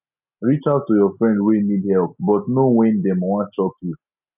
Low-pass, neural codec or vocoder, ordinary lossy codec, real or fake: 3.6 kHz; none; none; real